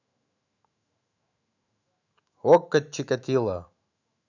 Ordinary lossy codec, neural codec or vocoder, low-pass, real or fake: none; autoencoder, 48 kHz, 128 numbers a frame, DAC-VAE, trained on Japanese speech; 7.2 kHz; fake